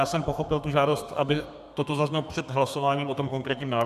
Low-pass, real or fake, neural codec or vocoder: 14.4 kHz; fake; codec, 32 kHz, 1.9 kbps, SNAC